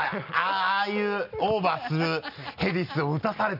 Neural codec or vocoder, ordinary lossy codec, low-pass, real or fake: none; none; 5.4 kHz; real